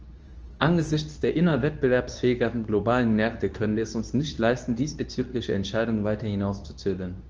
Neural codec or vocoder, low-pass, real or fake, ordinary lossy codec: codec, 24 kHz, 0.9 kbps, WavTokenizer, medium speech release version 2; 7.2 kHz; fake; Opus, 24 kbps